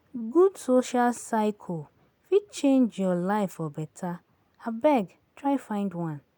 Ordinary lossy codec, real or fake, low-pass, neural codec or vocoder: none; real; none; none